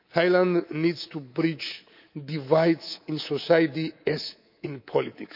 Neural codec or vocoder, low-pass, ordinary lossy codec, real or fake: codec, 24 kHz, 3.1 kbps, DualCodec; 5.4 kHz; none; fake